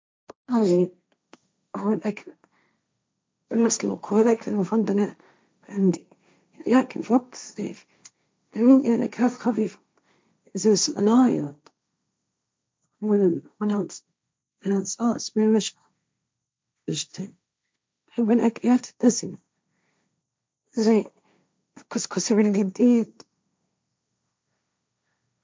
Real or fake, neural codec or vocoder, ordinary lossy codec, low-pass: fake; codec, 16 kHz, 1.1 kbps, Voila-Tokenizer; none; none